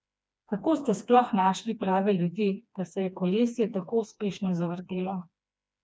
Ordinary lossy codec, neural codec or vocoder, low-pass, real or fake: none; codec, 16 kHz, 2 kbps, FreqCodec, smaller model; none; fake